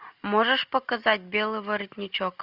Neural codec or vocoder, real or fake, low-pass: none; real; 5.4 kHz